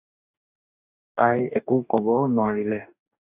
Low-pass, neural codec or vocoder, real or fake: 3.6 kHz; codec, 44.1 kHz, 2.6 kbps, DAC; fake